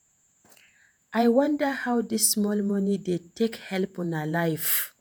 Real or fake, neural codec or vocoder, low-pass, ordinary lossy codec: fake; vocoder, 48 kHz, 128 mel bands, Vocos; none; none